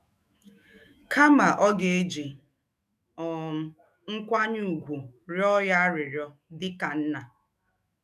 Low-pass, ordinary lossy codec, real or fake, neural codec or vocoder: 14.4 kHz; none; fake; autoencoder, 48 kHz, 128 numbers a frame, DAC-VAE, trained on Japanese speech